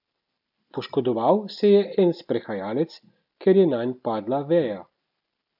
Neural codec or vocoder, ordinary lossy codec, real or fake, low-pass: none; none; real; 5.4 kHz